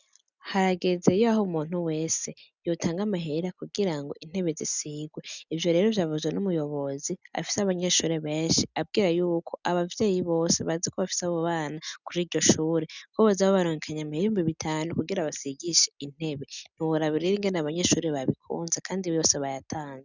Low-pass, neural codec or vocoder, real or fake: 7.2 kHz; none; real